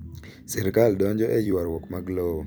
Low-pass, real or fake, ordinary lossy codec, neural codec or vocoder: none; fake; none; vocoder, 44.1 kHz, 128 mel bands every 256 samples, BigVGAN v2